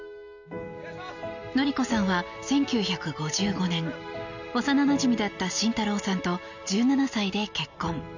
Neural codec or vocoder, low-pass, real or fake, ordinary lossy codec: none; 7.2 kHz; real; none